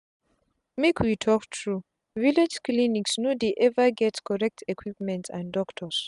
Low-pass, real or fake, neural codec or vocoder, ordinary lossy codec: 10.8 kHz; real; none; none